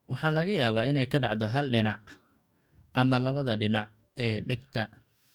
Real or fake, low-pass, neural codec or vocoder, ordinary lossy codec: fake; 19.8 kHz; codec, 44.1 kHz, 2.6 kbps, DAC; none